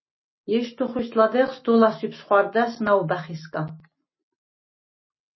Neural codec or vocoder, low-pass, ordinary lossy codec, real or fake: none; 7.2 kHz; MP3, 24 kbps; real